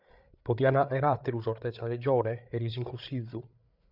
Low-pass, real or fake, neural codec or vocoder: 5.4 kHz; fake; codec, 16 kHz, 8 kbps, FreqCodec, larger model